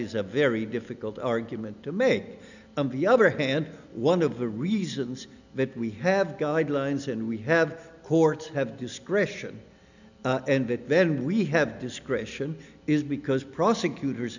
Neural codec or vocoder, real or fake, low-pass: none; real; 7.2 kHz